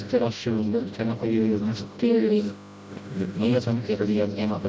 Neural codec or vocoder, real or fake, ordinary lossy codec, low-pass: codec, 16 kHz, 0.5 kbps, FreqCodec, smaller model; fake; none; none